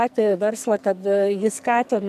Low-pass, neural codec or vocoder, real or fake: 14.4 kHz; codec, 44.1 kHz, 2.6 kbps, SNAC; fake